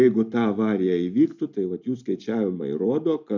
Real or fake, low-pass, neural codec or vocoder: real; 7.2 kHz; none